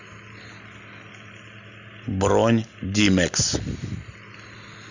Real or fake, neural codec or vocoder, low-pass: real; none; 7.2 kHz